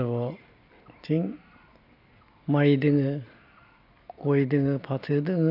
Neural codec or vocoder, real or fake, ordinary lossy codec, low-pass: none; real; AAC, 32 kbps; 5.4 kHz